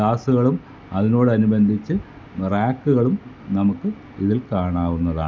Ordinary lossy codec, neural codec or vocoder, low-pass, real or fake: none; none; none; real